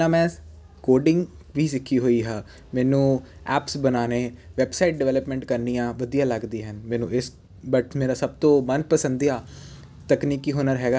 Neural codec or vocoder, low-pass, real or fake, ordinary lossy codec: none; none; real; none